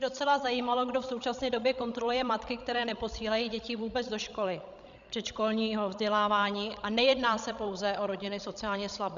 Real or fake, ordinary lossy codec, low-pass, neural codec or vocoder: fake; MP3, 96 kbps; 7.2 kHz; codec, 16 kHz, 16 kbps, FreqCodec, larger model